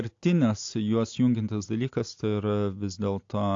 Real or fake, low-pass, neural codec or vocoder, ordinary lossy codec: real; 7.2 kHz; none; AAC, 48 kbps